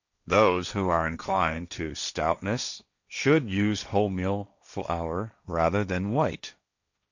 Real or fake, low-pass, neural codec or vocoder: fake; 7.2 kHz; codec, 16 kHz, 1.1 kbps, Voila-Tokenizer